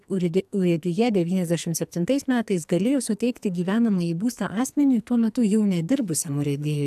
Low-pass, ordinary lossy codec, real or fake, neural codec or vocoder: 14.4 kHz; AAC, 96 kbps; fake; codec, 44.1 kHz, 2.6 kbps, SNAC